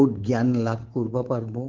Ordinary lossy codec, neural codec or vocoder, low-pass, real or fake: Opus, 16 kbps; codec, 24 kHz, 3.1 kbps, DualCodec; 7.2 kHz; fake